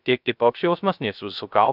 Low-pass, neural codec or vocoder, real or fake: 5.4 kHz; codec, 16 kHz, 0.3 kbps, FocalCodec; fake